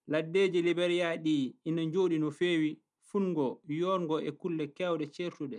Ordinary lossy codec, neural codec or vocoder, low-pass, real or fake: none; none; 10.8 kHz; real